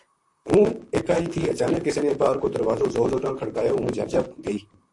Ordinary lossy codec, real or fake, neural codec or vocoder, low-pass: AAC, 64 kbps; fake; vocoder, 44.1 kHz, 128 mel bands, Pupu-Vocoder; 10.8 kHz